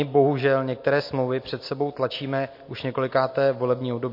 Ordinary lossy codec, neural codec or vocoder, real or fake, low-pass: MP3, 32 kbps; none; real; 5.4 kHz